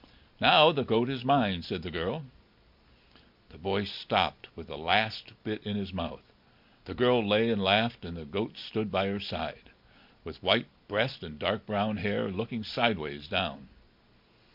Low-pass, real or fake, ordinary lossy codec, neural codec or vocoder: 5.4 kHz; real; MP3, 48 kbps; none